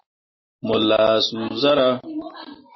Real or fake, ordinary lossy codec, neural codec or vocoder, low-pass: real; MP3, 24 kbps; none; 7.2 kHz